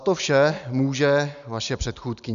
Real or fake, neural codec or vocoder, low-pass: real; none; 7.2 kHz